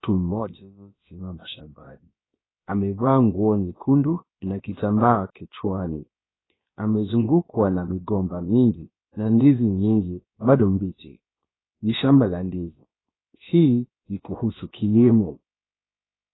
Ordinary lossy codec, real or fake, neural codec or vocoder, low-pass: AAC, 16 kbps; fake; codec, 16 kHz, about 1 kbps, DyCAST, with the encoder's durations; 7.2 kHz